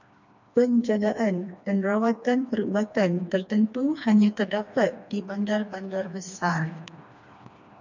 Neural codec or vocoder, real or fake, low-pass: codec, 16 kHz, 2 kbps, FreqCodec, smaller model; fake; 7.2 kHz